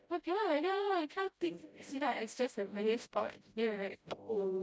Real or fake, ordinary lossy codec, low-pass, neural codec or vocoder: fake; none; none; codec, 16 kHz, 0.5 kbps, FreqCodec, smaller model